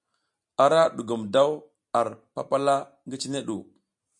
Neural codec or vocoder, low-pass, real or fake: none; 10.8 kHz; real